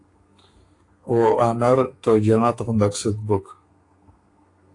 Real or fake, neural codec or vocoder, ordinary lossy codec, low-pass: fake; codec, 32 kHz, 1.9 kbps, SNAC; AAC, 48 kbps; 10.8 kHz